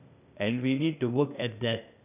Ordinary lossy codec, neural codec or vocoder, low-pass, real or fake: none; codec, 16 kHz, 0.8 kbps, ZipCodec; 3.6 kHz; fake